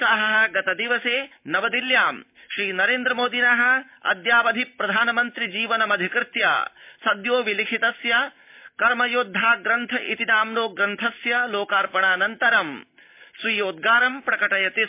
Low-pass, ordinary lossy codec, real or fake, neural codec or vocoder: 3.6 kHz; MP3, 32 kbps; real; none